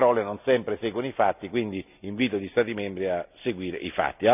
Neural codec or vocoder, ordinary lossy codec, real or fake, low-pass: none; none; real; 3.6 kHz